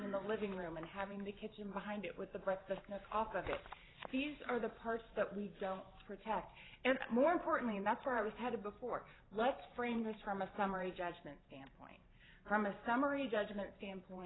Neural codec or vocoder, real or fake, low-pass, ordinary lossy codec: vocoder, 44.1 kHz, 128 mel bands every 512 samples, BigVGAN v2; fake; 7.2 kHz; AAC, 16 kbps